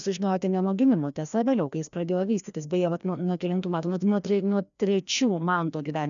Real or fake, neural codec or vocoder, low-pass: fake; codec, 16 kHz, 1 kbps, FreqCodec, larger model; 7.2 kHz